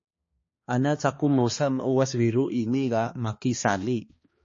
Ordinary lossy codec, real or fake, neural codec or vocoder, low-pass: MP3, 32 kbps; fake; codec, 16 kHz, 2 kbps, X-Codec, HuBERT features, trained on balanced general audio; 7.2 kHz